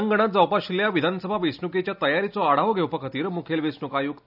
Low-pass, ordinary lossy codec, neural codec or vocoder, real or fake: 5.4 kHz; none; none; real